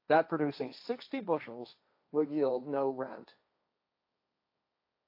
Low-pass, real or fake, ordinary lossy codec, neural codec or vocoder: 5.4 kHz; fake; AAC, 32 kbps; codec, 16 kHz, 1.1 kbps, Voila-Tokenizer